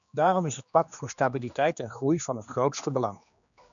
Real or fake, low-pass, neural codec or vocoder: fake; 7.2 kHz; codec, 16 kHz, 2 kbps, X-Codec, HuBERT features, trained on general audio